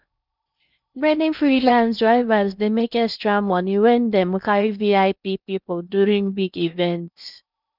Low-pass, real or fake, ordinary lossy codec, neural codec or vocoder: 5.4 kHz; fake; none; codec, 16 kHz in and 24 kHz out, 0.6 kbps, FocalCodec, streaming, 2048 codes